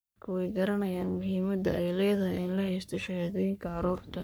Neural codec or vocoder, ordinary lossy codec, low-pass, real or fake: codec, 44.1 kHz, 3.4 kbps, Pupu-Codec; none; none; fake